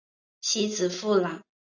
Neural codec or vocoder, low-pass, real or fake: none; 7.2 kHz; real